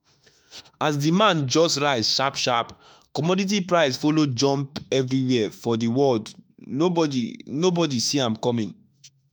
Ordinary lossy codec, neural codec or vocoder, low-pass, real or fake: none; autoencoder, 48 kHz, 32 numbers a frame, DAC-VAE, trained on Japanese speech; none; fake